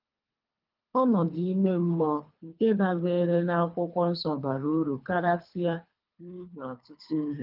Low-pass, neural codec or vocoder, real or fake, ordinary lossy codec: 5.4 kHz; codec, 24 kHz, 3 kbps, HILCodec; fake; Opus, 24 kbps